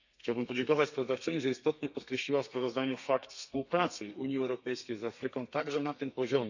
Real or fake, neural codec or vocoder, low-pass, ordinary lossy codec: fake; codec, 32 kHz, 1.9 kbps, SNAC; 7.2 kHz; none